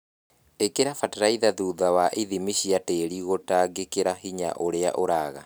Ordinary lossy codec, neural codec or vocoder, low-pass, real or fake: none; none; none; real